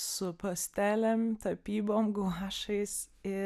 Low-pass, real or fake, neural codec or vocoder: 14.4 kHz; real; none